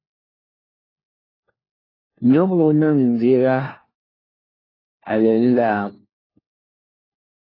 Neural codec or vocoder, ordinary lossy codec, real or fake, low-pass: codec, 16 kHz, 1 kbps, FunCodec, trained on LibriTTS, 50 frames a second; AAC, 24 kbps; fake; 5.4 kHz